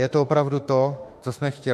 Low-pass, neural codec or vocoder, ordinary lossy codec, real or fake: 14.4 kHz; autoencoder, 48 kHz, 32 numbers a frame, DAC-VAE, trained on Japanese speech; MP3, 64 kbps; fake